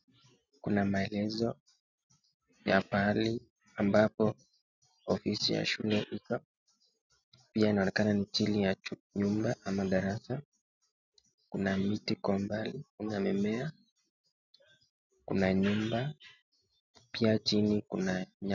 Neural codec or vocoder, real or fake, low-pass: none; real; 7.2 kHz